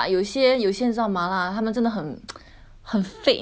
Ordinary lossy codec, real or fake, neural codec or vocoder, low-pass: none; real; none; none